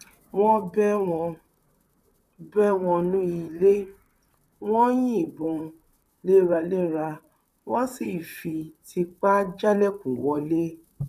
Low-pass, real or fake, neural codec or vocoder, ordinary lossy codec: 14.4 kHz; fake; vocoder, 44.1 kHz, 128 mel bands, Pupu-Vocoder; none